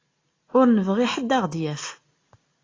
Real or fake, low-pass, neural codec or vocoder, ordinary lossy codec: real; 7.2 kHz; none; AAC, 32 kbps